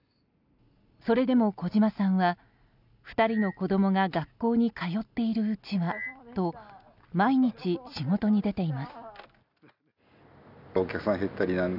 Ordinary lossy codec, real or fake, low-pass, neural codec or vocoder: none; real; 5.4 kHz; none